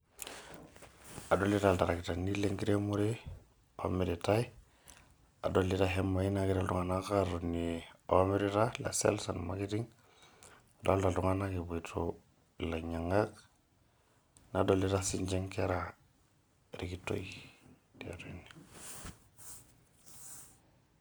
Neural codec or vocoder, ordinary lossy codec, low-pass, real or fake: none; none; none; real